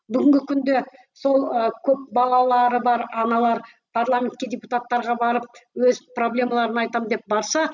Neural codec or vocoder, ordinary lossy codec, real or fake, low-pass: none; none; real; 7.2 kHz